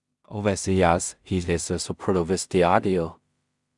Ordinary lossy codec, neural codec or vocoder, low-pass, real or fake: Opus, 64 kbps; codec, 16 kHz in and 24 kHz out, 0.4 kbps, LongCat-Audio-Codec, two codebook decoder; 10.8 kHz; fake